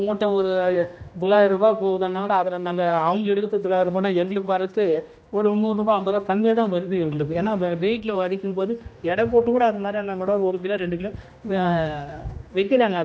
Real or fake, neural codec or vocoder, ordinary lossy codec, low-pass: fake; codec, 16 kHz, 1 kbps, X-Codec, HuBERT features, trained on general audio; none; none